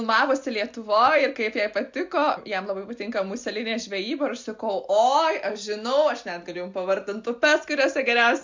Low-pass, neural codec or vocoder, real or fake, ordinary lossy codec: 7.2 kHz; none; real; MP3, 64 kbps